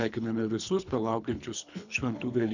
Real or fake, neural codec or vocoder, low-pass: fake; codec, 24 kHz, 3 kbps, HILCodec; 7.2 kHz